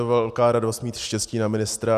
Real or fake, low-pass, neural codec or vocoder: real; 14.4 kHz; none